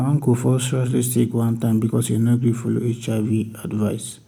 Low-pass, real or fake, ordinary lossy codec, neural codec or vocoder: none; real; none; none